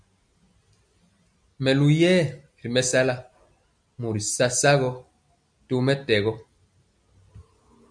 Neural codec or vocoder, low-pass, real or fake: none; 9.9 kHz; real